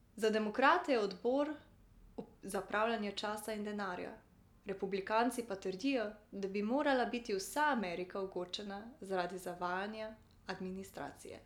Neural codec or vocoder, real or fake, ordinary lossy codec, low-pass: none; real; none; 19.8 kHz